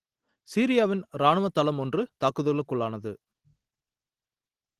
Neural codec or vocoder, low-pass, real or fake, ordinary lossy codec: none; 14.4 kHz; real; Opus, 16 kbps